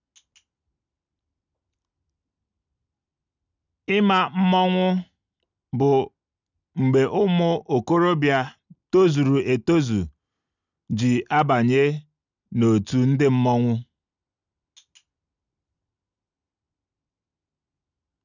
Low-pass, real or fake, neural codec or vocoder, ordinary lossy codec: 7.2 kHz; real; none; none